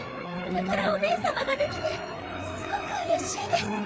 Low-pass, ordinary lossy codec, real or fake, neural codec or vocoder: none; none; fake; codec, 16 kHz, 4 kbps, FreqCodec, larger model